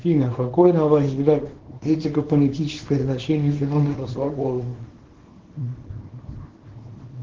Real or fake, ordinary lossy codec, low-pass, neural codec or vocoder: fake; Opus, 16 kbps; 7.2 kHz; codec, 24 kHz, 0.9 kbps, WavTokenizer, small release